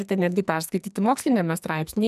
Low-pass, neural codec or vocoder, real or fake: 14.4 kHz; codec, 44.1 kHz, 2.6 kbps, SNAC; fake